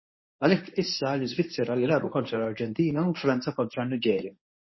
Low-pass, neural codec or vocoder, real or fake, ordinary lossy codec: 7.2 kHz; codec, 24 kHz, 0.9 kbps, WavTokenizer, medium speech release version 1; fake; MP3, 24 kbps